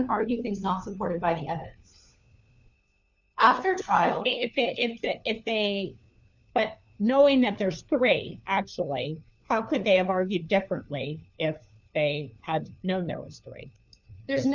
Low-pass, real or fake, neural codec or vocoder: 7.2 kHz; fake; codec, 16 kHz, 4 kbps, FunCodec, trained on LibriTTS, 50 frames a second